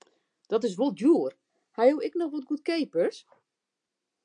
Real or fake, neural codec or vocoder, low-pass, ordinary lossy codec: real; none; 9.9 kHz; AAC, 64 kbps